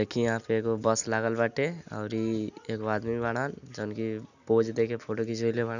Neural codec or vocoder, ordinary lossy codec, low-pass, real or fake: none; none; 7.2 kHz; real